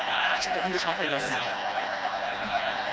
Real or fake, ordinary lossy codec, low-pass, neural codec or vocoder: fake; none; none; codec, 16 kHz, 1 kbps, FreqCodec, smaller model